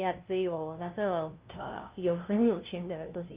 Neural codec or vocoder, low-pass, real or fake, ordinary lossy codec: codec, 16 kHz, 0.5 kbps, FunCodec, trained on LibriTTS, 25 frames a second; 3.6 kHz; fake; Opus, 32 kbps